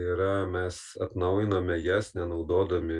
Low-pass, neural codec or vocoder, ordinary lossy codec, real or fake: 10.8 kHz; none; Opus, 64 kbps; real